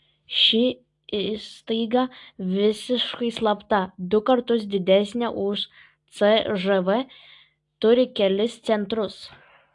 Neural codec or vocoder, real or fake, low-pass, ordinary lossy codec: none; real; 10.8 kHz; AAC, 64 kbps